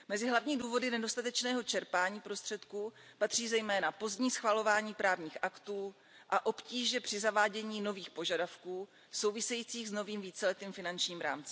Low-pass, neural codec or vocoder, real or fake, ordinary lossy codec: none; none; real; none